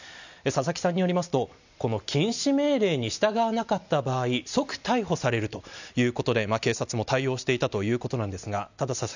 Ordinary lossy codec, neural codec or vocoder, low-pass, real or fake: none; none; 7.2 kHz; real